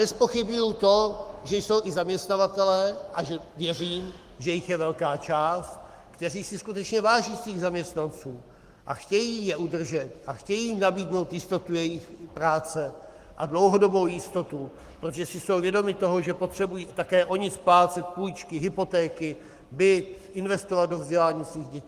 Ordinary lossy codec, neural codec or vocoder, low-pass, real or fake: Opus, 24 kbps; codec, 44.1 kHz, 7.8 kbps, Pupu-Codec; 14.4 kHz; fake